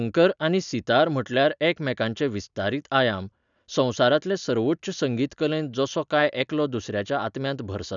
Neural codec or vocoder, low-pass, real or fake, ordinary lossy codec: none; 7.2 kHz; real; none